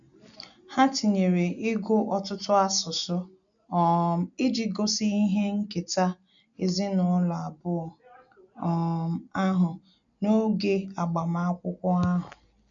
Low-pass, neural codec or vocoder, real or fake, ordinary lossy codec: 7.2 kHz; none; real; none